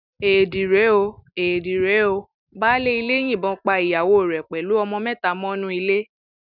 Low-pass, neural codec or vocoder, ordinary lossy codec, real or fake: 5.4 kHz; none; none; real